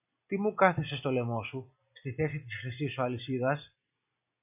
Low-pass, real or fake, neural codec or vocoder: 3.6 kHz; real; none